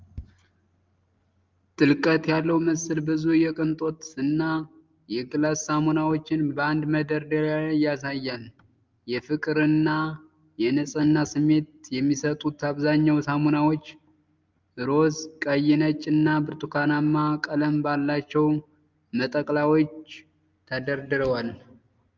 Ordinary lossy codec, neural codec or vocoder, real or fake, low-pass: Opus, 24 kbps; none; real; 7.2 kHz